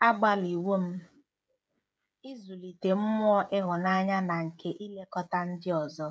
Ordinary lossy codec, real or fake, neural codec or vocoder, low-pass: none; fake; codec, 16 kHz, 16 kbps, FreqCodec, smaller model; none